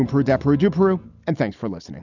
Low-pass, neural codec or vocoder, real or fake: 7.2 kHz; none; real